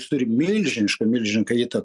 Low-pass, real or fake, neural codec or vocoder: 10.8 kHz; real; none